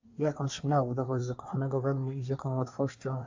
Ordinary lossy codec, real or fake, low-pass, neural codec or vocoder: AAC, 48 kbps; fake; 7.2 kHz; codec, 24 kHz, 1 kbps, SNAC